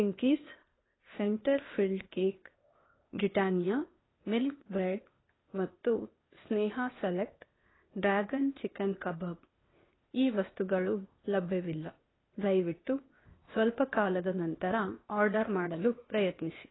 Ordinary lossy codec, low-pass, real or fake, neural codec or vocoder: AAC, 16 kbps; 7.2 kHz; fake; codec, 16 kHz, 0.8 kbps, ZipCodec